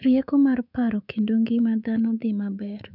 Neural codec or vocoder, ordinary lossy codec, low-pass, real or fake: codec, 24 kHz, 3.1 kbps, DualCodec; none; 5.4 kHz; fake